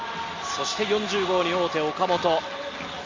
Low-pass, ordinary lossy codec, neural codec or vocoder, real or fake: 7.2 kHz; Opus, 32 kbps; none; real